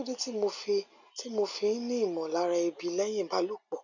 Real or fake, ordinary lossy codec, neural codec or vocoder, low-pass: real; none; none; 7.2 kHz